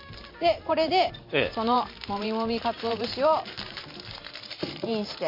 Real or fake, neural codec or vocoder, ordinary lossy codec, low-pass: fake; vocoder, 44.1 kHz, 128 mel bands every 512 samples, BigVGAN v2; MP3, 32 kbps; 5.4 kHz